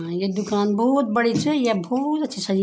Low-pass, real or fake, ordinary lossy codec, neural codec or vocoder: none; real; none; none